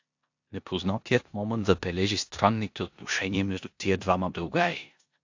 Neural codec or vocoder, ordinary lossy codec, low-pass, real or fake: codec, 16 kHz in and 24 kHz out, 0.9 kbps, LongCat-Audio-Codec, four codebook decoder; AAC, 48 kbps; 7.2 kHz; fake